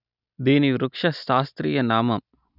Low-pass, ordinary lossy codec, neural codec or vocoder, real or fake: 5.4 kHz; none; none; real